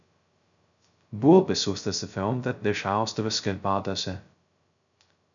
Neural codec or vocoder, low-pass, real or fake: codec, 16 kHz, 0.2 kbps, FocalCodec; 7.2 kHz; fake